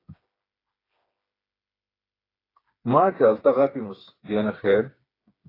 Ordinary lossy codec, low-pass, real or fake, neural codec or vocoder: AAC, 24 kbps; 5.4 kHz; fake; codec, 16 kHz, 4 kbps, FreqCodec, smaller model